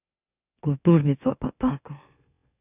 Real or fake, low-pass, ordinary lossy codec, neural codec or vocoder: fake; 3.6 kHz; none; autoencoder, 44.1 kHz, a latent of 192 numbers a frame, MeloTTS